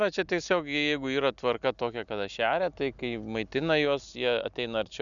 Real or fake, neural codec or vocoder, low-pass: real; none; 7.2 kHz